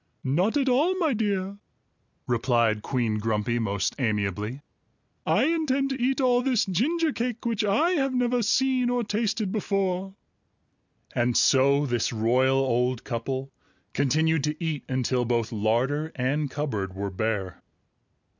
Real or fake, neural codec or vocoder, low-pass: real; none; 7.2 kHz